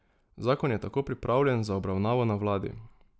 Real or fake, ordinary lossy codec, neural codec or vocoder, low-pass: real; none; none; none